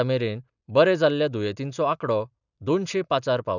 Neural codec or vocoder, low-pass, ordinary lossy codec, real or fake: none; 7.2 kHz; none; real